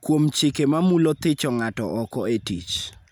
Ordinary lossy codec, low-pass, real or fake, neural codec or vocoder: none; none; real; none